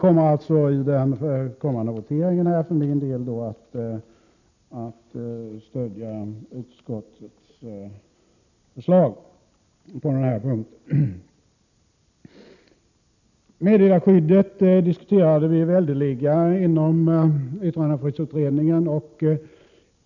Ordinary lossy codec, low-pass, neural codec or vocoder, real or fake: none; 7.2 kHz; none; real